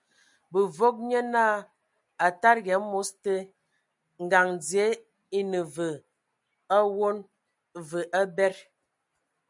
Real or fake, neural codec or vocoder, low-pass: real; none; 10.8 kHz